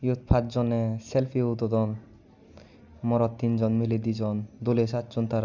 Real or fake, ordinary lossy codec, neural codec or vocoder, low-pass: real; none; none; 7.2 kHz